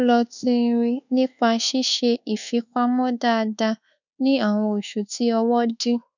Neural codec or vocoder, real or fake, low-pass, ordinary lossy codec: codec, 24 kHz, 1.2 kbps, DualCodec; fake; 7.2 kHz; none